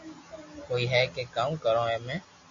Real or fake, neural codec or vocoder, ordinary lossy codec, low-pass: real; none; MP3, 48 kbps; 7.2 kHz